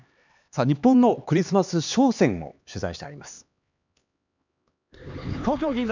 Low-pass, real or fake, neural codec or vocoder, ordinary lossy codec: 7.2 kHz; fake; codec, 16 kHz, 4 kbps, X-Codec, HuBERT features, trained on LibriSpeech; none